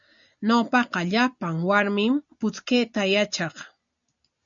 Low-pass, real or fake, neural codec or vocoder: 7.2 kHz; real; none